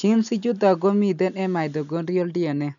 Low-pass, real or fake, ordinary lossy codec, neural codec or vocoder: 7.2 kHz; real; none; none